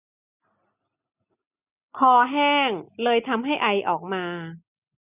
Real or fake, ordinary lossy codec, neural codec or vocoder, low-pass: real; none; none; 3.6 kHz